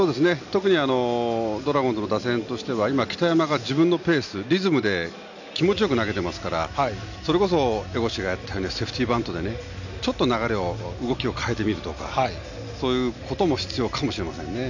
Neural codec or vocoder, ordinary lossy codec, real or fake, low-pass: none; none; real; 7.2 kHz